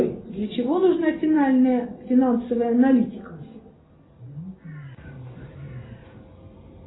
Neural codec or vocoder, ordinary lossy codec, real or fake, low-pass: none; AAC, 16 kbps; real; 7.2 kHz